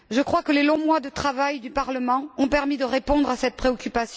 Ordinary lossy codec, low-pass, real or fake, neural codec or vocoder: none; none; real; none